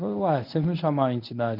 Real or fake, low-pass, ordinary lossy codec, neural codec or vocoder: fake; 5.4 kHz; none; codec, 24 kHz, 0.9 kbps, WavTokenizer, medium speech release version 1